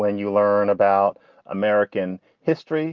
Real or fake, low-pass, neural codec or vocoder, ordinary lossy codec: real; 7.2 kHz; none; Opus, 32 kbps